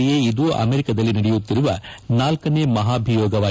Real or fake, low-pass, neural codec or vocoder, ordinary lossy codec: real; none; none; none